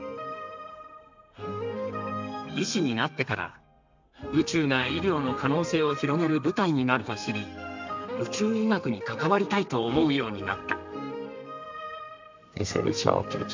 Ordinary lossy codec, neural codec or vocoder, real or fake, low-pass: MP3, 64 kbps; codec, 32 kHz, 1.9 kbps, SNAC; fake; 7.2 kHz